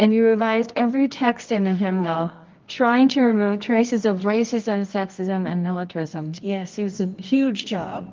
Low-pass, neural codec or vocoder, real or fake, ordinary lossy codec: 7.2 kHz; codec, 24 kHz, 0.9 kbps, WavTokenizer, medium music audio release; fake; Opus, 32 kbps